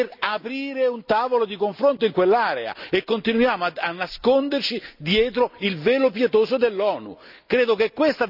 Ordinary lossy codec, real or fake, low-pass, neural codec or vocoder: none; real; 5.4 kHz; none